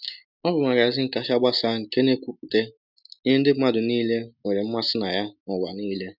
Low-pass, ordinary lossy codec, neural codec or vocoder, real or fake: 5.4 kHz; none; none; real